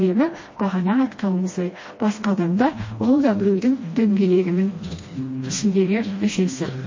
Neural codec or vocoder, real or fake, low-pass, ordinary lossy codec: codec, 16 kHz, 1 kbps, FreqCodec, smaller model; fake; 7.2 kHz; MP3, 32 kbps